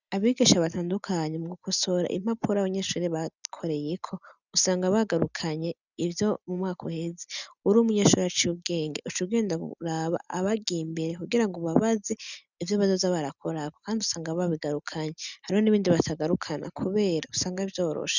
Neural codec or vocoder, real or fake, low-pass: none; real; 7.2 kHz